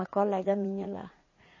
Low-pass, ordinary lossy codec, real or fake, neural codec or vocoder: 7.2 kHz; MP3, 32 kbps; fake; vocoder, 44.1 kHz, 80 mel bands, Vocos